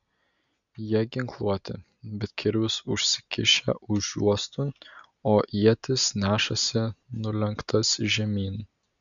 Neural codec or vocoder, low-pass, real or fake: none; 7.2 kHz; real